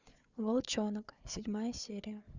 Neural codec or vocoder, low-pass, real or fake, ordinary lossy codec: codec, 16 kHz, 4 kbps, FunCodec, trained on Chinese and English, 50 frames a second; 7.2 kHz; fake; Opus, 64 kbps